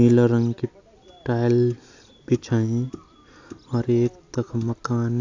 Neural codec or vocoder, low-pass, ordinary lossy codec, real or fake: none; 7.2 kHz; AAC, 48 kbps; real